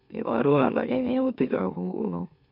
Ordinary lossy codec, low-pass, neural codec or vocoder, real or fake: none; 5.4 kHz; autoencoder, 44.1 kHz, a latent of 192 numbers a frame, MeloTTS; fake